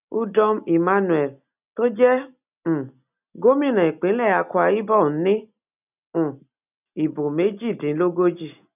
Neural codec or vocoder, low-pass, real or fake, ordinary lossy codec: none; 3.6 kHz; real; none